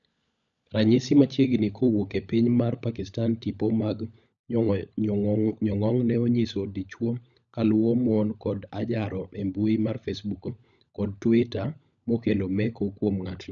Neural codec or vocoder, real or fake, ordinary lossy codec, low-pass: codec, 16 kHz, 16 kbps, FunCodec, trained on LibriTTS, 50 frames a second; fake; none; 7.2 kHz